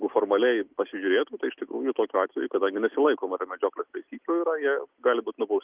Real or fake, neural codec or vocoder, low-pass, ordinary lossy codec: real; none; 3.6 kHz; Opus, 24 kbps